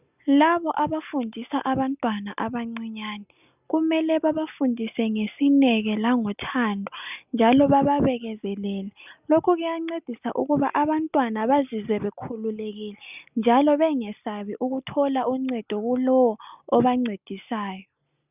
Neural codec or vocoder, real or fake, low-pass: none; real; 3.6 kHz